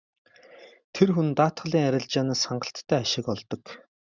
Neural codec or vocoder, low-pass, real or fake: none; 7.2 kHz; real